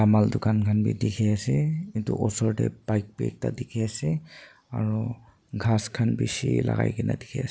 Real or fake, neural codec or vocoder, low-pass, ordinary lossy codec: real; none; none; none